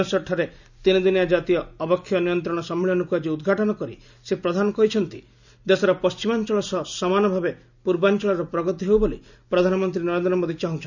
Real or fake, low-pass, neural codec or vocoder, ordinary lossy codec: real; 7.2 kHz; none; none